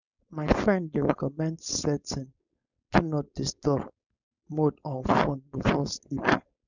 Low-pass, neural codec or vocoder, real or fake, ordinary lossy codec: 7.2 kHz; codec, 16 kHz, 4.8 kbps, FACodec; fake; none